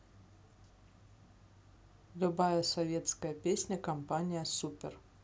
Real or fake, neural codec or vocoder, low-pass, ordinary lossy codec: real; none; none; none